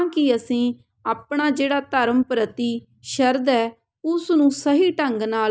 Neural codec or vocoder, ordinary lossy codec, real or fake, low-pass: none; none; real; none